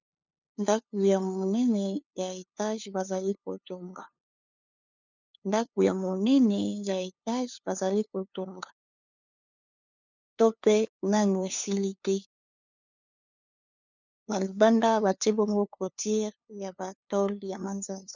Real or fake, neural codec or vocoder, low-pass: fake; codec, 16 kHz, 2 kbps, FunCodec, trained on LibriTTS, 25 frames a second; 7.2 kHz